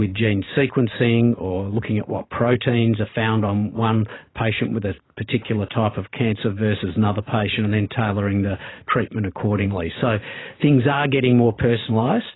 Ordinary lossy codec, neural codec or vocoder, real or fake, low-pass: AAC, 16 kbps; vocoder, 44.1 kHz, 128 mel bands every 512 samples, BigVGAN v2; fake; 7.2 kHz